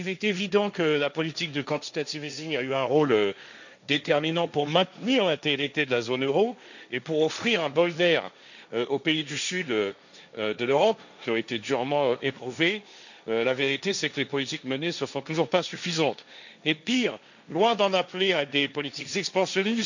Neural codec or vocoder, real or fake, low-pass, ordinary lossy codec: codec, 16 kHz, 1.1 kbps, Voila-Tokenizer; fake; 7.2 kHz; none